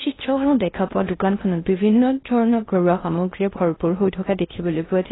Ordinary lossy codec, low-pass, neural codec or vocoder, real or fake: AAC, 16 kbps; 7.2 kHz; codec, 16 kHz in and 24 kHz out, 0.6 kbps, FocalCodec, streaming, 4096 codes; fake